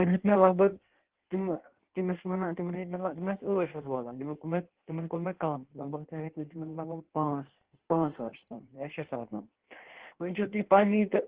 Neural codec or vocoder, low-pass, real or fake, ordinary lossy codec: codec, 16 kHz in and 24 kHz out, 1.1 kbps, FireRedTTS-2 codec; 3.6 kHz; fake; Opus, 16 kbps